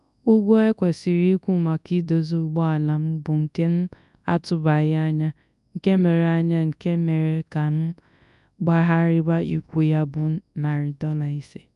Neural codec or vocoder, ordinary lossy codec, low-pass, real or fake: codec, 24 kHz, 0.9 kbps, WavTokenizer, large speech release; none; 10.8 kHz; fake